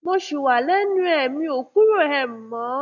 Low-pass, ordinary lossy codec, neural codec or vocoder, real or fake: 7.2 kHz; none; none; real